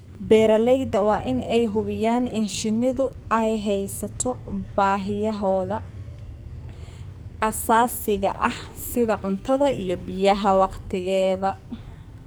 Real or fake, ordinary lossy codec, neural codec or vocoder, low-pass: fake; none; codec, 44.1 kHz, 2.6 kbps, SNAC; none